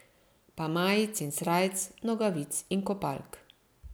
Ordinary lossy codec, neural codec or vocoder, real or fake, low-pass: none; none; real; none